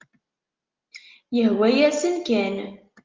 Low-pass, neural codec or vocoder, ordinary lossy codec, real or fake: 7.2 kHz; none; Opus, 32 kbps; real